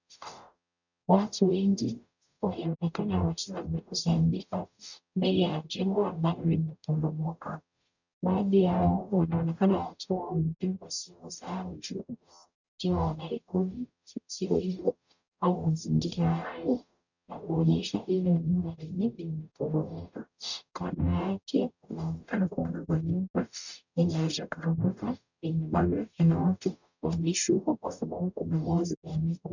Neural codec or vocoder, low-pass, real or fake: codec, 44.1 kHz, 0.9 kbps, DAC; 7.2 kHz; fake